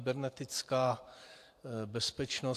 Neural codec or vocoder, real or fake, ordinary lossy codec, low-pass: vocoder, 44.1 kHz, 128 mel bands every 512 samples, BigVGAN v2; fake; AAC, 64 kbps; 14.4 kHz